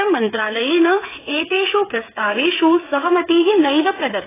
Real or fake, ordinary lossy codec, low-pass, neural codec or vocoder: fake; AAC, 16 kbps; 3.6 kHz; codec, 16 kHz, 8 kbps, FreqCodec, smaller model